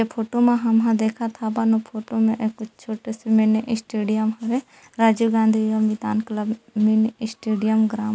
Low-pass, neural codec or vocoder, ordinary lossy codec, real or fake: none; none; none; real